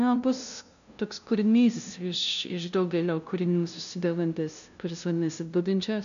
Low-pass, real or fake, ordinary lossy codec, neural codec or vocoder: 7.2 kHz; fake; AAC, 96 kbps; codec, 16 kHz, 0.5 kbps, FunCodec, trained on LibriTTS, 25 frames a second